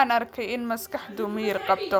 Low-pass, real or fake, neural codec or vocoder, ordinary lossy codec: none; real; none; none